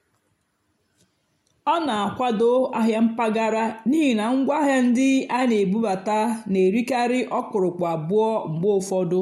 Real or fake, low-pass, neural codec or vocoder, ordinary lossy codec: real; 19.8 kHz; none; MP3, 64 kbps